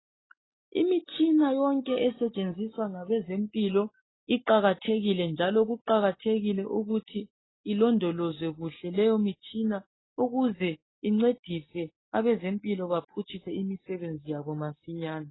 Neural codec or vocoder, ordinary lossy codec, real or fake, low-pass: none; AAC, 16 kbps; real; 7.2 kHz